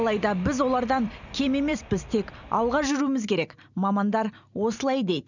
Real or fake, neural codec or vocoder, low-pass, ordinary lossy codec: real; none; 7.2 kHz; none